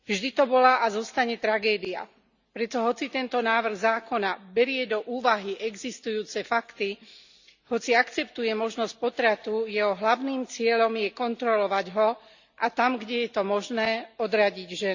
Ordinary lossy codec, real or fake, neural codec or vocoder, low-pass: Opus, 64 kbps; real; none; 7.2 kHz